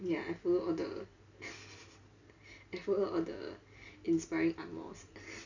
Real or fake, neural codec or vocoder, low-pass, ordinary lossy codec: real; none; 7.2 kHz; none